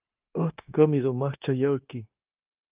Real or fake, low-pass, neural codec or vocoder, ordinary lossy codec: fake; 3.6 kHz; codec, 16 kHz, 0.9 kbps, LongCat-Audio-Codec; Opus, 32 kbps